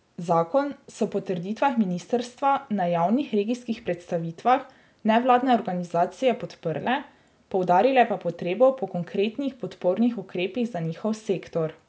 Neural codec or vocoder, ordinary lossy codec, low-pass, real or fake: none; none; none; real